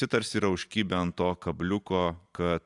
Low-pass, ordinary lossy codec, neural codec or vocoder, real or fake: 10.8 kHz; AAC, 64 kbps; none; real